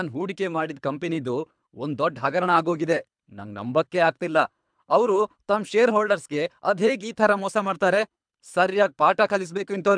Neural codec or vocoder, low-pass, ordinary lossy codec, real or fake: codec, 24 kHz, 3 kbps, HILCodec; 9.9 kHz; MP3, 96 kbps; fake